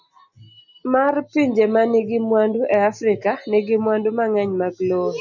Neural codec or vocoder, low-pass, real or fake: none; 7.2 kHz; real